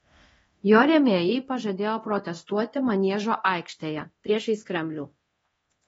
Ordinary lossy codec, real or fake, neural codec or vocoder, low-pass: AAC, 24 kbps; fake; codec, 24 kHz, 0.9 kbps, DualCodec; 10.8 kHz